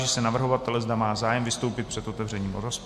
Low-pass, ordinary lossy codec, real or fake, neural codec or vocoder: 14.4 kHz; MP3, 64 kbps; real; none